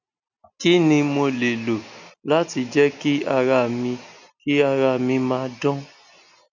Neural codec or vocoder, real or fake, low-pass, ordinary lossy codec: none; real; 7.2 kHz; none